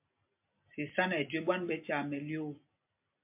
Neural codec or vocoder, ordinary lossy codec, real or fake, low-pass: none; MP3, 32 kbps; real; 3.6 kHz